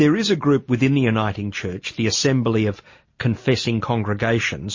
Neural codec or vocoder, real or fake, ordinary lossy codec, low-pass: none; real; MP3, 32 kbps; 7.2 kHz